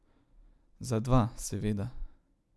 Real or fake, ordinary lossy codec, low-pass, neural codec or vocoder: real; none; none; none